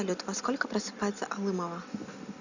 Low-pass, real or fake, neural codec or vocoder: 7.2 kHz; real; none